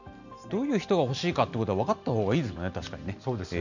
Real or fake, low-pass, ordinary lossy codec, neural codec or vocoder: real; 7.2 kHz; none; none